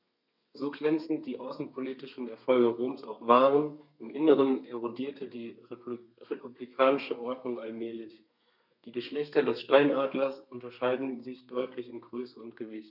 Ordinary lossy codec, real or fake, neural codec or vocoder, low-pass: none; fake; codec, 32 kHz, 1.9 kbps, SNAC; 5.4 kHz